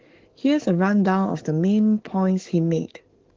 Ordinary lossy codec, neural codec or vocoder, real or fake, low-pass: Opus, 16 kbps; codec, 44.1 kHz, 7.8 kbps, DAC; fake; 7.2 kHz